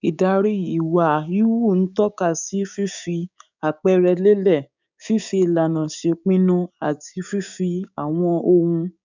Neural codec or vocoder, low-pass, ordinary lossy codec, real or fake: codec, 16 kHz, 6 kbps, DAC; 7.2 kHz; none; fake